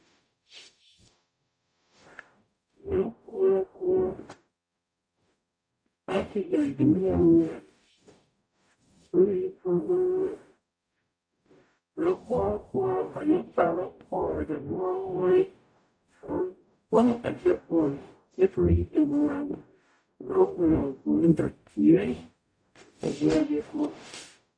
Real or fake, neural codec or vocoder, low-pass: fake; codec, 44.1 kHz, 0.9 kbps, DAC; 9.9 kHz